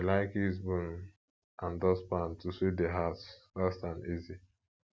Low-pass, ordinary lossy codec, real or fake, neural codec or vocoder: none; none; real; none